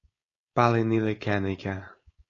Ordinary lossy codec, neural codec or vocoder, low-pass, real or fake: AAC, 32 kbps; codec, 16 kHz, 4.8 kbps, FACodec; 7.2 kHz; fake